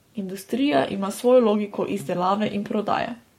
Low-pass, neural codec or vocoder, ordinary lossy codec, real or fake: 19.8 kHz; codec, 44.1 kHz, 7.8 kbps, Pupu-Codec; MP3, 64 kbps; fake